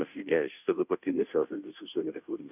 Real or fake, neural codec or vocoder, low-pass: fake; codec, 16 kHz, 0.5 kbps, FunCodec, trained on Chinese and English, 25 frames a second; 3.6 kHz